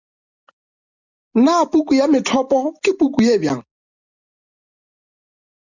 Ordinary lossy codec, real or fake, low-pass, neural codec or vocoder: Opus, 64 kbps; real; 7.2 kHz; none